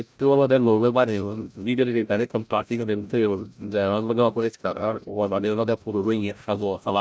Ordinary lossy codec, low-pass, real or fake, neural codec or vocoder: none; none; fake; codec, 16 kHz, 0.5 kbps, FreqCodec, larger model